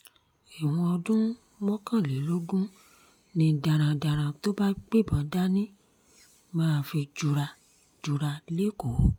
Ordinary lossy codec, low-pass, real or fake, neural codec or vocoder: none; 19.8 kHz; real; none